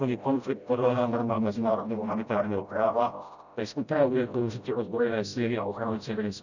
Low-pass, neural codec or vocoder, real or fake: 7.2 kHz; codec, 16 kHz, 0.5 kbps, FreqCodec, smaller model; fake